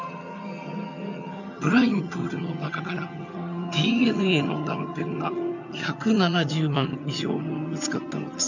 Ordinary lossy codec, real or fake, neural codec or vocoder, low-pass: none; fake; vocoder, 22.05 kHz, 80 mel bands, HiFi-GAN; 7.2 kHz